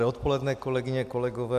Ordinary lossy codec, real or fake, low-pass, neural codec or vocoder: AAC, 96 kbps; real; 14.4 kHz; none